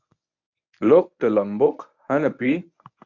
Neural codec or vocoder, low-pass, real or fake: codec, 24 kHz, 0.9 kbps, WavTokenizer, medium speech release version 1; 7.2 kHz; fake